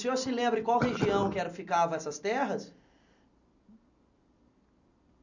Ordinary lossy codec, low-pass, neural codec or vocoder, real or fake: none; 7.2 kHz; none; real